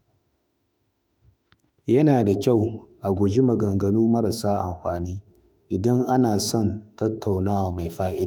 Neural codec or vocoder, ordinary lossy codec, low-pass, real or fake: autoencoder, 48 kHz, 32 numbers a frame, DAC-VAE, trained on Japanese speech; none; none; fake